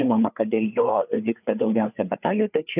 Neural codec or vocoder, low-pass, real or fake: codec, 16 kHz, 4 kbps, FreqCodec, larger model; 3.6 kHz; fake